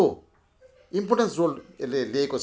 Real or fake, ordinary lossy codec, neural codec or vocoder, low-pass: real; none; none; none